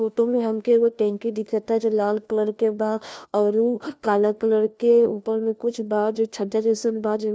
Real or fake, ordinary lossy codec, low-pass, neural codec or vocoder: fake; none; none; codec, 16 kHz, 1 kbps, FunCodec, trained on LibriTTS, 50 frames a second